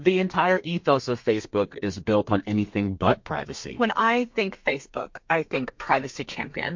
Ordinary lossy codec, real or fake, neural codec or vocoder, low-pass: MP3, 48 kbps; fake; codec, 32 kHz, 1.9 kbps, SNAC; 7.2 kHz